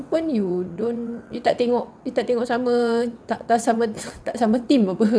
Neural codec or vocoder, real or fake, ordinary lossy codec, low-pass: vocoder, 22.05 kHz, 80 mel bands, WaveNeXt; fake; none; none